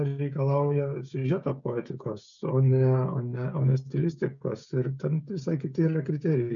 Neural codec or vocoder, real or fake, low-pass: codec, 16 kHz, 16 kbps, FreqCodec, smaller model; fake; 7.2 kHz